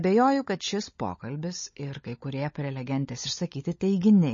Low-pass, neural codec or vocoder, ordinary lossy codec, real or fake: 7.2 kHz; codec, 16 kHz, 16 kbps, FunCodec, trained on LibriTTS, 50 frames a second; MP3, 32 kbps; fake